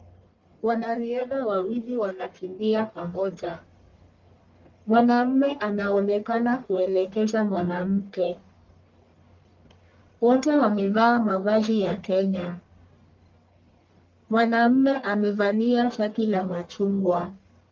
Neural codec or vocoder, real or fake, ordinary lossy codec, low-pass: codec, 44.1 kHz, 1.7 kbps, Pupu-Codec; fake; Opus, 32 kbps; 7.2 kHz